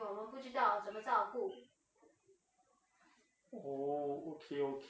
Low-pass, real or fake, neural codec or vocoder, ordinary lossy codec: none; real; none; none